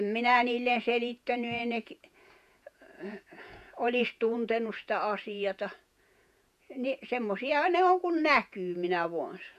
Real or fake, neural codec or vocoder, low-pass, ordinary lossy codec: fake; vocoder, 48 kHz, 128 mel bands, Vocos; 14.4 kHz; none